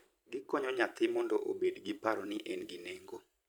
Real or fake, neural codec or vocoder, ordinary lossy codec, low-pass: fake; vocoder, 44.1 kHz, 128 mel bands every 512 samples, BigVGAN v2; none; none